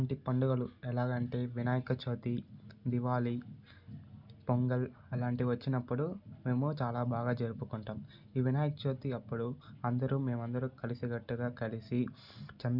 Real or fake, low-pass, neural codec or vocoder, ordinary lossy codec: real; 5.4 kHz; none; none